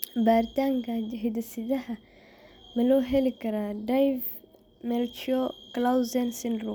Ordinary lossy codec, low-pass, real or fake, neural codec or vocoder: none; none; real; none